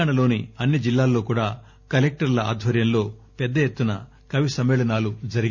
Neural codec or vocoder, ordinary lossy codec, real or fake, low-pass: none; none; real; 7.2 kHz